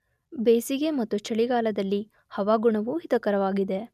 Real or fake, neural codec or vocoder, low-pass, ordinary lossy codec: real; none; 14.4 kHz; none